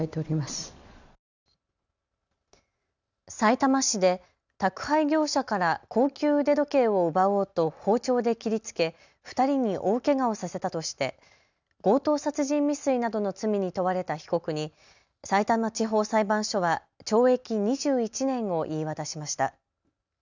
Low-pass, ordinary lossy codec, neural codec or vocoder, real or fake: 7.2 kHz; none; none; real